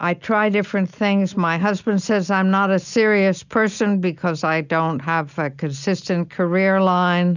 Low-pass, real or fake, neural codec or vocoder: 7.2 kHz; real; none